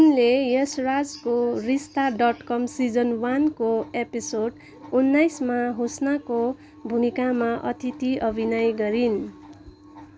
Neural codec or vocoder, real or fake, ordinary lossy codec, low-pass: none; real; none; none